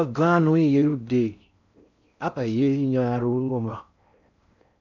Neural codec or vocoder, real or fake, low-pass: codec, 16 kHz in and 24 kHz out, 0.6 kbps, FocalCodec, streaming, 4096 codes; fake; 7.2 kHz